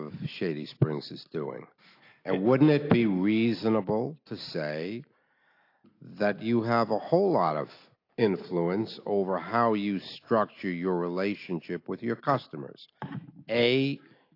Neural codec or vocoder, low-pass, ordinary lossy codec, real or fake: none; 5.4 kHz; AAC, 32 kbps; real